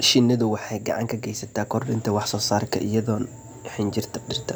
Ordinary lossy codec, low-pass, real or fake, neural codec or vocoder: none; none; real; none